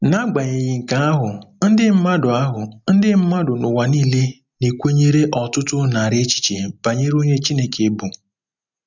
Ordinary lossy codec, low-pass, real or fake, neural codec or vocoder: none; 7.2 kHz; real; none